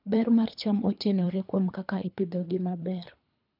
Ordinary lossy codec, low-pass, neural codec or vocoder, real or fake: none; 5.4 kHz; codec, 24 kHz, 3 kbps, HILCodec; fake